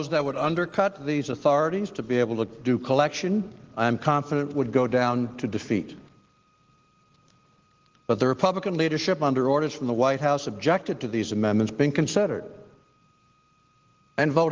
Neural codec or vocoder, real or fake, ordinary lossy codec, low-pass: none; real; Opus, 16 kbps; 7.2 kHz